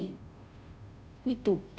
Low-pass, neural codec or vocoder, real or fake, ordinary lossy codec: none; codec, 16 kHz, 0.5 kbps, FunCodec, trained on Chinese and English, 25 frames a second; fake; none